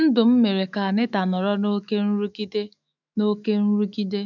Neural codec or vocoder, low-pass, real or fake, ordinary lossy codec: autoencoder, 48 kHz, 128 numbers a frame, DAC-VAE, trained on Japanese speech; 7.2 kHz; fake; none